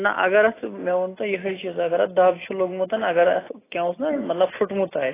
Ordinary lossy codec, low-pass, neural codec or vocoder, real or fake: AAC, 16 kbps; 3.6 kHz; none; real